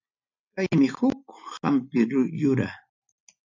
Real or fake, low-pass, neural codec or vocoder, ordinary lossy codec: real; 7.2 kHz; none; AAC, 48 kbps